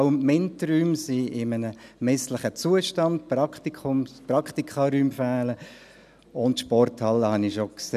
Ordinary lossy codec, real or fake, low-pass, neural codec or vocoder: none; real; 14.4 kHz; none